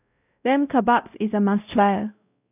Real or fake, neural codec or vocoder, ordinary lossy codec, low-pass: fake; codec, 16 kHz, 0.5 kbps, X-Codec, WavLM features, trained on Multilingual LibriSpeech; none; 3.6 kHz